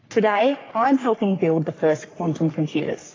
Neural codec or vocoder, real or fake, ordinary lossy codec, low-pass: codec, 44.1 kHz, 3.4 kbps, Pupu-Codec; fake; AAC, 32 kbps; 7.2 kHz